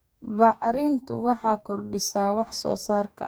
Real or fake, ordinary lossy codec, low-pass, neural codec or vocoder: fake; none; none; codec, 44.1 kHz, 2.6 kbps, DAC